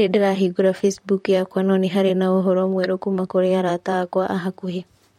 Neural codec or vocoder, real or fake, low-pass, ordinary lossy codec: vocoder, 44.1 kHz, 128 mel bands, Pupu-Vocoder; fake; 19.8 kHz; MP3, 64 kbps